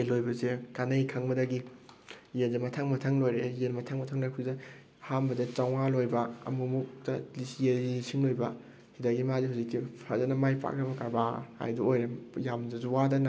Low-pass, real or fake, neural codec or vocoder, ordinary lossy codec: none; real; none; none